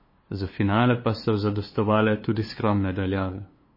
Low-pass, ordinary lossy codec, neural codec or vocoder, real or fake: 5.4 kHz; MP3, 24 kbps; codec, 16 kHz, 2 kbps, FunCodec, trained on LibriTTS, 25 frames a second; fake